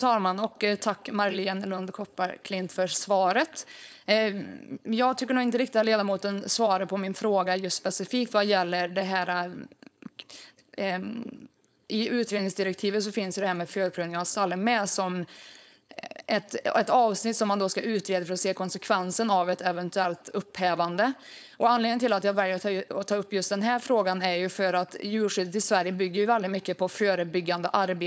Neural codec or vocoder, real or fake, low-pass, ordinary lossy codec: codec, 16 kHz, 4.8 kbps, FACodec; fake; none; none